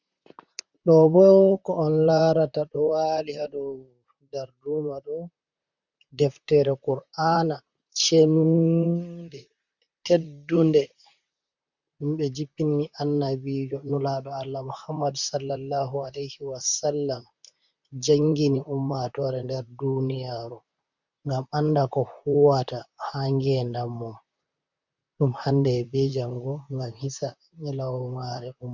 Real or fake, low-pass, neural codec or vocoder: fake; 7.2 kHz; vocoder, 44.1 kHz, 128 mel bands, Pupu-Vocoder